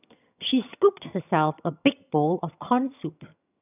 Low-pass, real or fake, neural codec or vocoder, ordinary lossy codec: 3.6 kHz; fake; vocoder, 22.05 kHz, 80 mel bands, HiFi-GAN; none